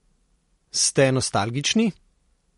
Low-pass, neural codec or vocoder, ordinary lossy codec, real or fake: 19.8 kHz; none; MP3, 48 kbps; real